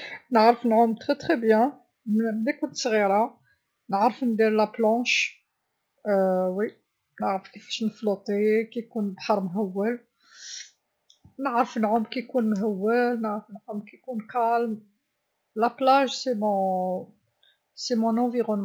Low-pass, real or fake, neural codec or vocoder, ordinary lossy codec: none; real; none; none